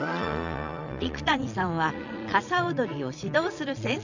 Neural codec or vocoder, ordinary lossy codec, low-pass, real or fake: vocoder, 22.05 kHz, 80 mel bands, Vocos; none; 7.2 kHz; fake